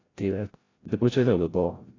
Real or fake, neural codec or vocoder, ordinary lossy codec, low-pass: fake; codec, 16 kHz, 0.5 kbps, FreqCodec, larger model; AAC, 32 kbps; 7.2 kHz